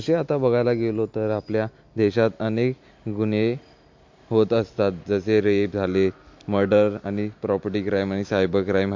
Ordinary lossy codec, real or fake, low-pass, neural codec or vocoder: MP3, 48 kbps; real; 7.2 kHz; none